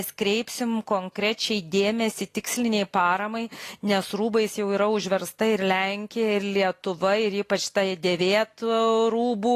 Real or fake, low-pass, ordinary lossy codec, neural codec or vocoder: real; 14.4 kHz; AAC, 48 kbps; none